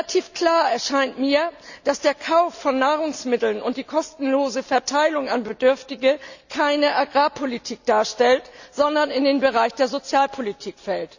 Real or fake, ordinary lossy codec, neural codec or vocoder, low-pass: real; none; none; 7.2 kHz